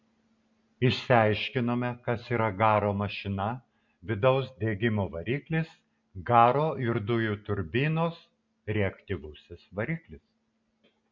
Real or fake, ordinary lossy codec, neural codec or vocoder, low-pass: real; MP3, 64 kbps; none; 7.2 kHz